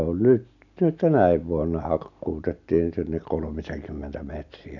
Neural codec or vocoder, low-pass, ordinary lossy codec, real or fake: none; 7.2 kHz; none; real